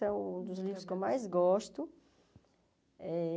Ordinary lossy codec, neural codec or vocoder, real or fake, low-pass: none; none; real; none